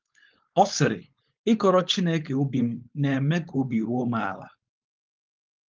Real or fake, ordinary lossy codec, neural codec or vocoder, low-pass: fake; Opus, 32 kbps; codec, 16 kHz, 4.8 kbps, FACodec; 7.2 kHz